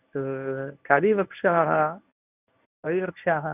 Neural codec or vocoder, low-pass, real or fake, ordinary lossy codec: codec, 24 kHz, 0.9 kbps, WavTokenizer, medium speech release version 1; 3.6 kHz; fake; none